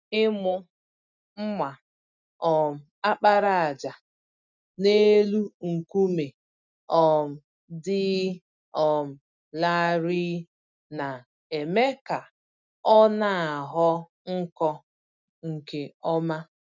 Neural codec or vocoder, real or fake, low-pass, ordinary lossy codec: none; real; 7.2 kHz; none